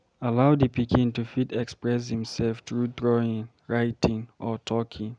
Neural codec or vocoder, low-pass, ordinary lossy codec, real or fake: none; 9.9 kHz; none; real